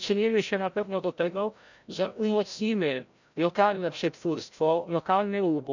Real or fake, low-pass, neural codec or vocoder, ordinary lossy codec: fake; 7.2 kHz; codec, 16 kHz, 0.5 kbps, FreqCodec, larger model; none